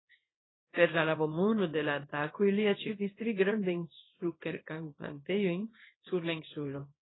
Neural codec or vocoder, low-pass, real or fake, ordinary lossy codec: codec, 24 kHz, 0.9 kbps, WavTokenizer, small release; 7.2 kHz; fake; AAC, 16 kbps